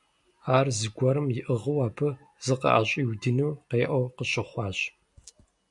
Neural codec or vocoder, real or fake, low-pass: none; real; 10.8 kHz